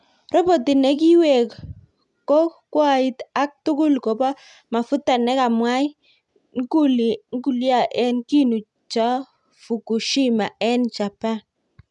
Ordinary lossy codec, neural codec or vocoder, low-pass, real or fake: none; none; 10.8 kHz; real